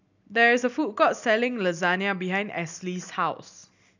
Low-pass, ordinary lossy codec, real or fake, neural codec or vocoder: 7.2 kHz; none; real; none